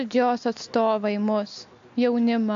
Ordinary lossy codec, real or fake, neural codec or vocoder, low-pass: AAC, 64 kbps; real; none; 7.2 kHz